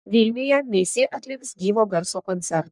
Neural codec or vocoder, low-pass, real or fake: codec, 44.1 kHz, 1.7 kbps, Pupu-Codec; 10.8 kHz; fake